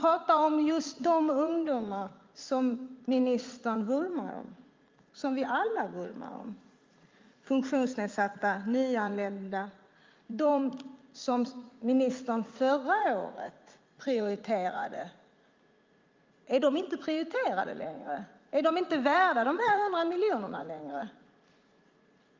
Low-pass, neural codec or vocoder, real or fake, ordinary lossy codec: 7.2 kHz; vocoder, 44.1 kHz, 80 mel bands, Vocos; fake; Opus, 24 kbps